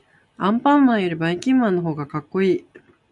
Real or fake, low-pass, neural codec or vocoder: real; 10.8 kHz; none